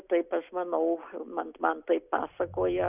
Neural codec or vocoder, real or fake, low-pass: none; real; 3.6 kHz